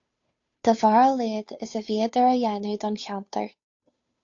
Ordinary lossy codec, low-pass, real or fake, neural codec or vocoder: AAC, 48 kbps; 7.2 kHz; fake; codec, 16 kHz, 2 kbps, FunCodec, trained on Chinese and English, 25 frames a second